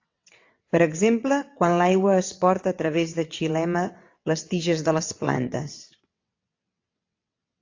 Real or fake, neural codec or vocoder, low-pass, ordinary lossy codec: fake; vocoder, 22.05 kHz, 80 mel bands, WaveNeXt; 7.2 kHz; AAC, 48 kbps